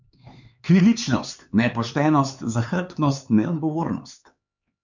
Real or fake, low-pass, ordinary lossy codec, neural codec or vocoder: fake; 7.2 kHz; none; codec, 16 kHz, 4 kbps, X-Codec, HuBERT features, trained on LibriSpeech